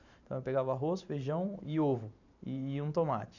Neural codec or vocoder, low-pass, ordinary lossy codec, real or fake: vocoder, 44.1 kHz, 128 mel bands every 512 samples, BigVGAN v2; 7.2 kHz; none; fake